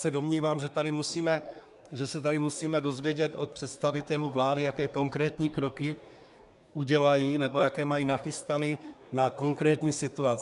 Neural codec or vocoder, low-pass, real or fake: codec, 24 kHz, 1 kbps, SNAC; 10.8 kHz; fake